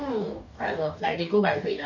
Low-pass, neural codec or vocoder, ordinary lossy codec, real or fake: 7.2 kHz; codec, 44.1 kHz, 2.6 kbps, DAC; none; fake